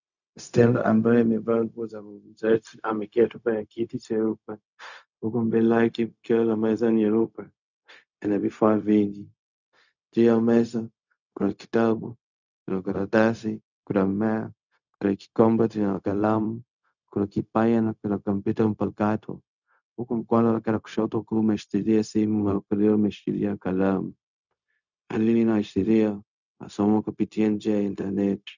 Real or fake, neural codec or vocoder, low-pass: fake; codec, 16 kHz, 0.4 kbps, LongCat-Audio-Codec; 7.2 kHz